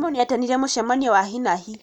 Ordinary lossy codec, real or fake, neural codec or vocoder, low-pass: none; real; none; 19.8 kHz